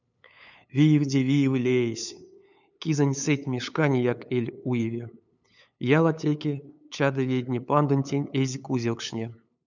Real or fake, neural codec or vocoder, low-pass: fake; codec, 16 kHz, 8 kbps, FunCodec, trained on LibriTTS, 25 frames a second; 7.2 kHz